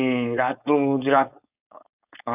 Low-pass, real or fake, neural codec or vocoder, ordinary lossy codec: 3.6 kHz; fake; codec, 16 kHz, 4.8 kbps, FACodec; none